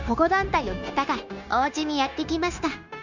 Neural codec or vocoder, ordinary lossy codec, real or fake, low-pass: codec, 16 kHz, 0.9 kbps, LongCat-Audio-Codec; none; fake; 7.2 kHz